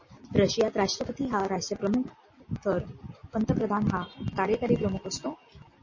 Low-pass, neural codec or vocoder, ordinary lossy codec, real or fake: 7.2 kHz; none; MP3, 32 kbps; real